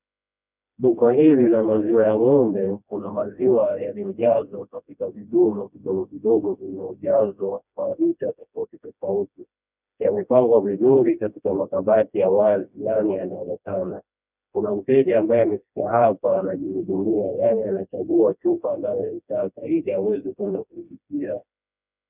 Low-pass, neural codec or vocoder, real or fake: 3.6 kHz; codec, 16 kHz, 1 kbps, FreqCodec, smaller model; fake